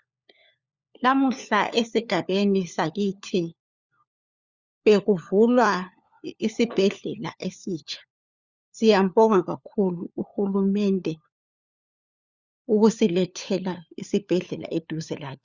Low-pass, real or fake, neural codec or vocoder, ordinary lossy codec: 7.2 kHz; fake; codec, 16 kHz, 4 kbps, FunCodec, trained on LibriTTS, 50 frames a second; Opus, 64 kbps